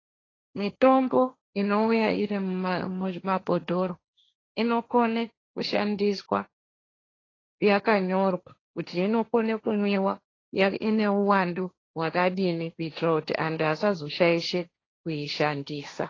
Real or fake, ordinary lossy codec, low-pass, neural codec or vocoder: fake; AAC, 32 kbps; 7.2 kHz; codec, 16 kHz, 1.1 kbps, Voila-Tokenizer